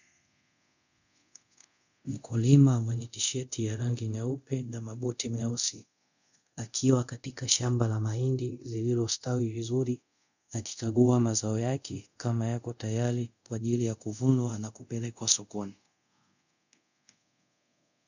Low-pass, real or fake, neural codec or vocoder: 7.2 kHz; fake; codec, 24 kHz, 0.5 kbps, DualCodec